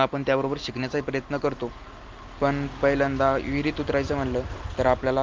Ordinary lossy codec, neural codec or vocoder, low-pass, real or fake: Opus, 32 kbps; none; 7.2 kHz; real